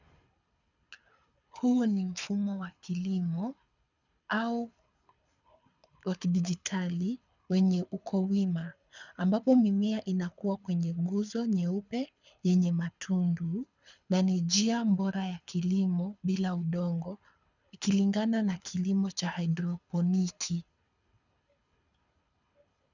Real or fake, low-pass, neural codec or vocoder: fake; 7.2 kHz; codec, 24 kHz, 6 kbps, HILCodec